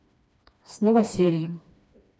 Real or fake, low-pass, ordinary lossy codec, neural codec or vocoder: fake; none; none; codec, 16 kHz, 2 kbps, FreqCodec, smaller model